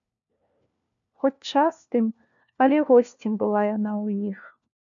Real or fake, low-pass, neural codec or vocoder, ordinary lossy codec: fake; 7.2 kHz; codec, 16 kHz, 1 kbps, FunCodec, trained on LibriTTS, 50 frames a second; MP3, 96 kbps